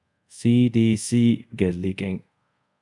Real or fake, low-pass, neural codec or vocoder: fake; 10.8 kHz; codec, 24 kHz, 0.5 kbps, DualCodec